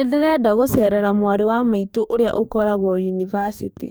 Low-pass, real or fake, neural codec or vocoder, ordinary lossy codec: none; fake; codec, 44.1 kHz, 2.6 kbps, DAC; none